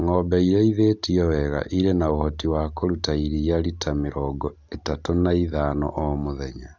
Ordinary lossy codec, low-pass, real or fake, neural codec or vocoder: none; 7.2 kHz; real; none